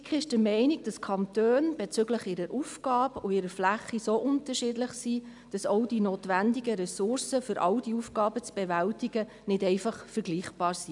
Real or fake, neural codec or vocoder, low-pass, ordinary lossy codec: real; none; 10.8 kHz; MP3, 96 kbps